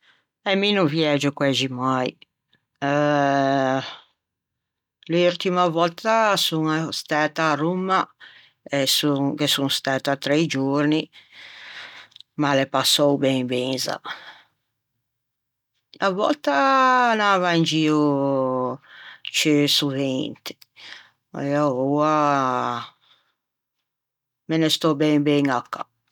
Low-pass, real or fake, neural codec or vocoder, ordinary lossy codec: 19.8 kHz; real; none; none